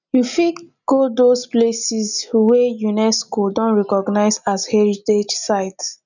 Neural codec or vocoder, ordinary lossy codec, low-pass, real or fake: none; none; 7.2 kHz; real